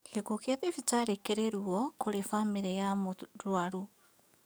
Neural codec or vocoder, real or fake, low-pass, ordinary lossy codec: codec, 44.1 kHz, 7.8 kbps, DAC; fake; none; none